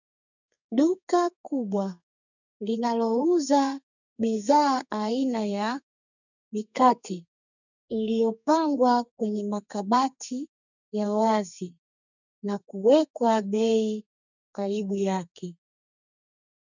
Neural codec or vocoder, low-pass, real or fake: codec, 32 kHz, 1.9 kbps, SNAC; 7.2 kHz; fake